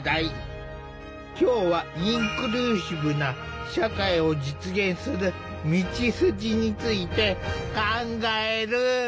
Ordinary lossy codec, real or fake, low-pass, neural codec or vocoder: none; real; none; none